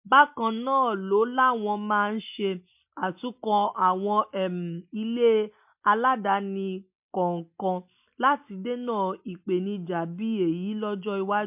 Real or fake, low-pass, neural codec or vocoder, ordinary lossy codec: real; 3.6 kHz; none; MP3, 32 kbps